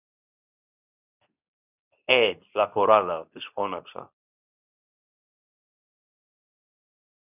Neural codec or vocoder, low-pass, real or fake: codec, 24 kHz, 0.9 kbps, WavTokenizer, medium speech release version 2; 3.6 kHz; fake